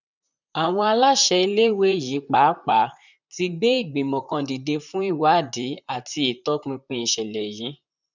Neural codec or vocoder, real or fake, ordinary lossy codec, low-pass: vocoder, 44.1 kHz, 128 mel bands, Pupu-Vocoder; fake; none; 7.2 kHz